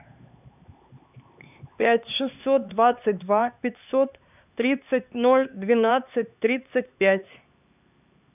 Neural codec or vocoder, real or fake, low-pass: codec, 16 kHz, 4 kbps, X-Codec, HuBERT features, trained on LibriSpeech; fake; 3.6 kHz